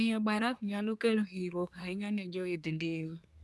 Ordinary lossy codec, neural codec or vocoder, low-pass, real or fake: none; codec, 24 kHz, 1 kbps, SNAC; none; fake